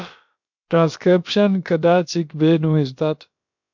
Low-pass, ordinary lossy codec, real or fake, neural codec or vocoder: 7.2 kHz; MP3, 64 kbps; fake; codec, 16 kHz, about 1 kbps, DyCAST, with the encoder's durations